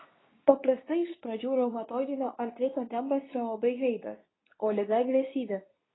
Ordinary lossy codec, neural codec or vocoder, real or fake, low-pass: AAC, 16 kbps; codec, 24 kHz, 0.9 kbps, WavTokenizer, medium speech release version 2; fake; 7.2 kHz